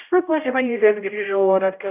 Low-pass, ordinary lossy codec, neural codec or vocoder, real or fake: 3.6 kHz; AAC, 32 kbps; codec, 16 kHz, 0.5 kbps, X-Codec, HuBERT features, trained on general audio; fake